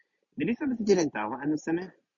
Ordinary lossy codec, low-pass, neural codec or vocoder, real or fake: MP3, 48 kbps; 7.2 kHz; none; real